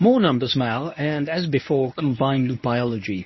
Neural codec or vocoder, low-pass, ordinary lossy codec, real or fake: codec, 24 kHz, 0.9 kbps, WavTokenizer, medium speech release version 1; 7.2 kHz; MP3, 24 kbps; fake